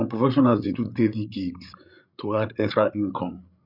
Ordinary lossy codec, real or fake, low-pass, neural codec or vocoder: none; fake; 5.4 kHz; codec, 16 kHz, 8 kbps, FreqCodec, larger model